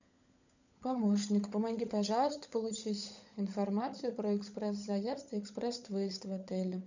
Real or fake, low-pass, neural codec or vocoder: fake; 7.2 kHz; codec, 16 kHz, 16 kbps, FunCodec, trained on LibriTTS, 50 frames a second